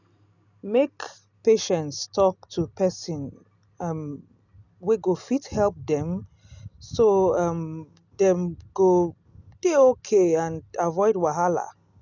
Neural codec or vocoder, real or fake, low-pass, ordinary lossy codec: none; real; 7.2 kHz; none